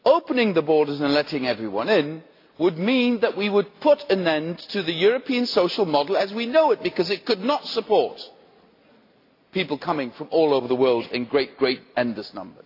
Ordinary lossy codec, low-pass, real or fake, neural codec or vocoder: AAC, 32 kbps; 5.4 kHz; real; none